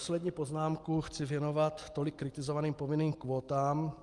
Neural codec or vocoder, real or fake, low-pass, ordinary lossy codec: none; real; 10.8 kHz; Opus, 24 kbps